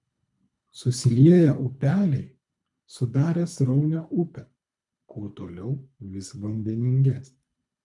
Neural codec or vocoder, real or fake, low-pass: codec, 24 kHz, 3 kbps, HILCodec; fake; 10.8 kHz